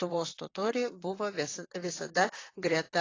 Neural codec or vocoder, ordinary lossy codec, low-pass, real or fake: vocoder, 44.1 kHz, 128 mel bands, Pupu-Vocoder; AAC, 32 kbps; 7.2 kHz; fake